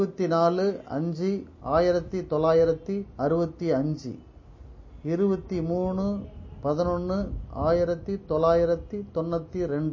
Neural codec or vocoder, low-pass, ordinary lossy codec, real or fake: none; 7.2 kHz; MP3, 32 kbps; real